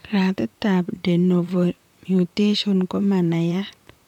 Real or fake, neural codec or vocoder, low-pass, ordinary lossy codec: fake; vocoder, 44.1 kHz, 128 mel bands, Pupu-Vocoder; 19.8 kHz; none